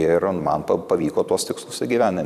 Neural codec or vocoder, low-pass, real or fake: vocoder, 44.1 kHz, 128 mel bands every 256 samples, BigVGAN v2; 14.4 kHz; fake